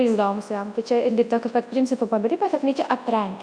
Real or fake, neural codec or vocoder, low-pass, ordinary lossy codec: fake; codec, 24 kHz, 0.9 kbps, WavTokenizer, large speech release; 9.9 kHz; Opus, 64 kbps